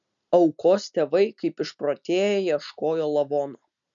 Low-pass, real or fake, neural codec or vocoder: 7.2 kHz; real; none